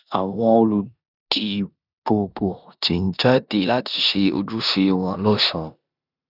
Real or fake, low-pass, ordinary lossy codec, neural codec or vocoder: fake; 5.4 kHz; none; codec, 16 kHz in and 24 kHz out, 0.9 kbps, LongCat-Audio-Codec, four codebook decoder